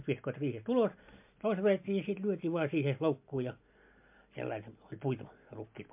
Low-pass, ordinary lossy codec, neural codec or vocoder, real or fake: 3.6 kHz; MP3, 32 kbps; none; real